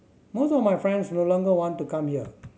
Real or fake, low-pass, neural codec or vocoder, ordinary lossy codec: real; none; none; none